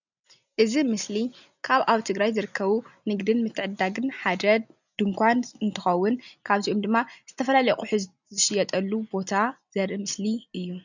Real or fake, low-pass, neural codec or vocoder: real; 7.2 kHz; none